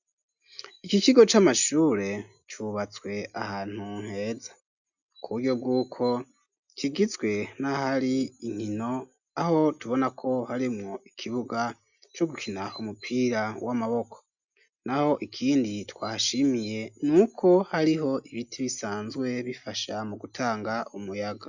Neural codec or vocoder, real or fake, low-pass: none; real; 7.2 kHz